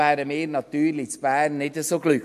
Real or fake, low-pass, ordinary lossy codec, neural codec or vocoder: real; 14.4 kHz; MP3, 64 kbps; none